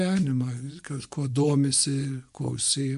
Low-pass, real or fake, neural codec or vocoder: 10.8 kHz; fake; vocoder, 24 kHz, 100 mel bands, Vocos